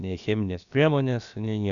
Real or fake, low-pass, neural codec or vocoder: fake; 7.2 kHz; codec, 16 kHz, about 1 kbps, DyCAST, with the encoder's durations